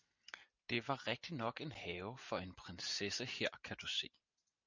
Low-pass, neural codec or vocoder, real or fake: 7.2 kHz; none; real